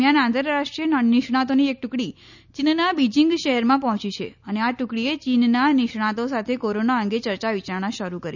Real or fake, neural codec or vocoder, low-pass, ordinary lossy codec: real; none; 7.2 kHz; none